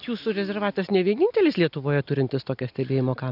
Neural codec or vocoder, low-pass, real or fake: none; 5.4 kHz; real